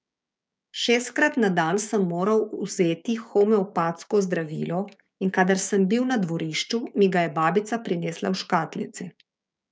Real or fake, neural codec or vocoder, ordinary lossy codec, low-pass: fake; codec, 16 kHz, 6 kbps, DAC; none; none